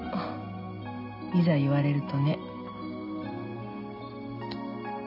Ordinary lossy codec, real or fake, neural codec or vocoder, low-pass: none; real; none; 5.4 kHz